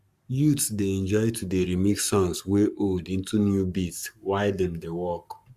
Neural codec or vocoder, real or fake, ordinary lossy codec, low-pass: codec, 44.1 kHz, 7.8 kbps, Pupu-Codec; fake; Opus, 64 kbps; 14.4 kHz